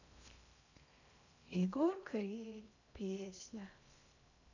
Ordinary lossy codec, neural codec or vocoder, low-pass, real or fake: none; codec, 16 kHz in and 24 kHz out, 0.6 kbps, FocalCodec, streaming, 2048 codes; 7.2 kHz; fake